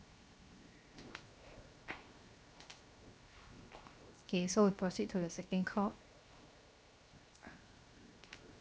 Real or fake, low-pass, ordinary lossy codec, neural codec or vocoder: fake; none; none; codec, 16 kHz, 0.7 kbps, FocalCodec